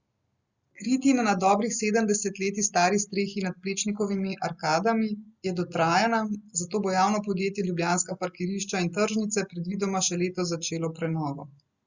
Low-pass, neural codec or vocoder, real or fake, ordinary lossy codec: 7.2 kHz; none; real; Opus, 64 kbps